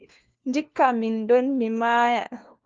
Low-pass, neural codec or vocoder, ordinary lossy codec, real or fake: 7.2 kHz; codec, 16 kHz, 1 kbps, FunCodec, trained on LibriTTS, 50 frames a second; Opus, 24 kbps; fake